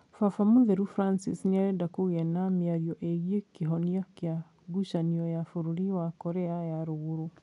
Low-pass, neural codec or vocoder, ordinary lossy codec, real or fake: 10.8 kHz; none; none; real